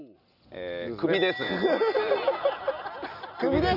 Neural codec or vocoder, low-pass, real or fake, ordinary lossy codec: none; 5.4 kHz; real; none